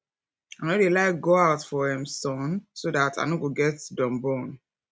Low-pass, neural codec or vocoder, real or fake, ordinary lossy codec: none; none; real; none